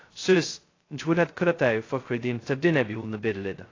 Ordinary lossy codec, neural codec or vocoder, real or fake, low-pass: AAC, 32 kbps; codec, 16 kHz, 0.2 kbps, FocalCodec; fake; 7.2 kHz